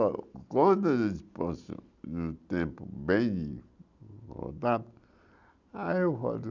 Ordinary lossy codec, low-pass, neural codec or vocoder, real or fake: none; 7.2 kHz; none; real